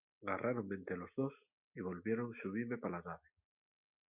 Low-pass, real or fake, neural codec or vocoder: 3.6 kHz; real; none